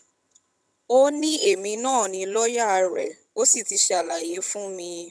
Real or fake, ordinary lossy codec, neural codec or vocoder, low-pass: fake; none; vocoder, 22.05 kHz, 80 mel bands, HiFi-GAN; none